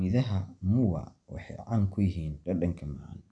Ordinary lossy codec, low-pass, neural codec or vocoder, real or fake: none; 9.9 kHz; none; real